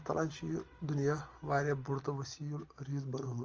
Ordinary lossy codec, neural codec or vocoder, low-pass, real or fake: Opus, 24 kbps; none; 7.2 kHz; real